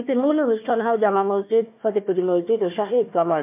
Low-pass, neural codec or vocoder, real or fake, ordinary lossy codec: 3.6 kHz; codec, 16 kHz, 1 kbps, FunCodec, trained on Chinese and English, 50 frames a second; fake; MP3, 32 kbps